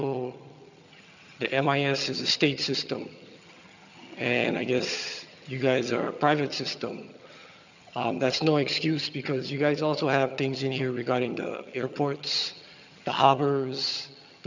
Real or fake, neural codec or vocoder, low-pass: fake; vocoder, 22.05 kHz, 80 mel bands, HiFi-GAN; 7.2 kHz